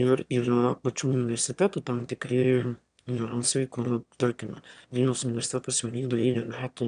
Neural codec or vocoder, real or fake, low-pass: autoencoder, 22.05 kHz, a latent of 192 numbers a frame, VITS, trained on one speaker; fake; 9.9 kHz